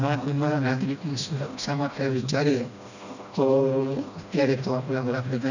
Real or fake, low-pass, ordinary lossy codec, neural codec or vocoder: fake; 7.2 kHz; none; codec, 16 kHz, 1 kbps, FreqCodec, smaller model